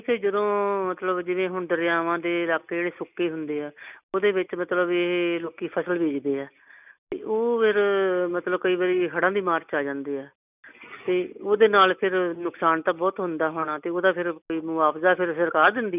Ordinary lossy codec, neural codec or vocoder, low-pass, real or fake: none; none; 3.6 kHz; real